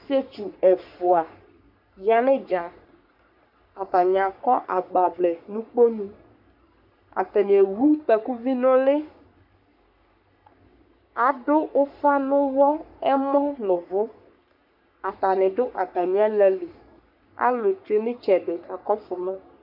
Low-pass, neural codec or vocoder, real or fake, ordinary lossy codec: 5.4 kHz; codec, 44.1 kHz, 3.4 kbps, Pupu-Codec; fake; MP3, 48 kbps